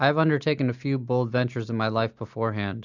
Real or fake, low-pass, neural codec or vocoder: real; 7.2 kHz; none